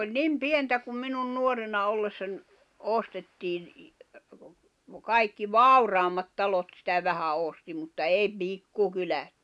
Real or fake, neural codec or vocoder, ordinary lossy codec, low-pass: real; none; none; none